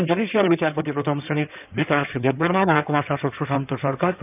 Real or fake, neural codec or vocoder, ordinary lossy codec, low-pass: fake; codec, 16 kHz in and 24 kHz out, 2.2 kbps, FireRedTTS-2 codec; none; 3.6 kHz